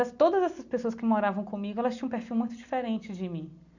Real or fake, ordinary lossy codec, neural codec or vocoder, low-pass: real; none; none; 7.2 kHz